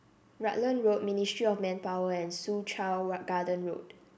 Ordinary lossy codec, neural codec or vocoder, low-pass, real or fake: none; none; none; real